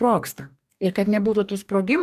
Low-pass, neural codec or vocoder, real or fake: 14.4 kHz; codec, 44.1 kHz, 2.6 kbps, DAC; fake